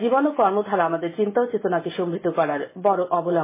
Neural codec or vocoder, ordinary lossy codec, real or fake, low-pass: none; MP3, 16 kbps; real; 3.6 kHz